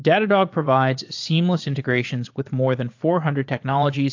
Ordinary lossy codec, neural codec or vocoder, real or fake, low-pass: AAC, 48 kbps; vocoder, 22.05 kHz, 80 mel bands, Vocos; fake; 7.2 kHz